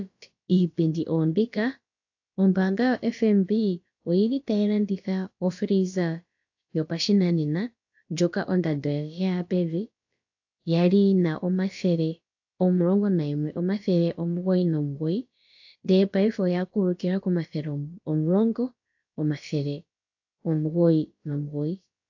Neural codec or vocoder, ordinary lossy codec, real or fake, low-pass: codec, 16 kHz, about 1 kbps, DyCAST, with the encoder's durations; AAC, 48 kbps; fake; 7.2 kHz